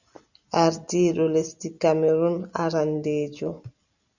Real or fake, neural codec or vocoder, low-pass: real; none; 7.2 kHz